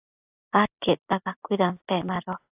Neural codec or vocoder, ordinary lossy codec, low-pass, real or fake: none; AAC, 32 kbps; 3.6 kHz; real